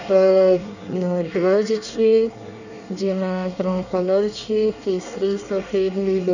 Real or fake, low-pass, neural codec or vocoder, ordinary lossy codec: fake; 7.2 kHz; codec, 24 kHz, 1 kbps, SNAC; none